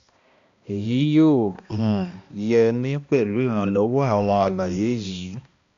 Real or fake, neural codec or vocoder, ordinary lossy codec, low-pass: fake; codec, 16 kHz, 1 kbps, X-Codec, HuBERT features, trained on balanced general audio; AAC, 64 kbps; 7.2 kHz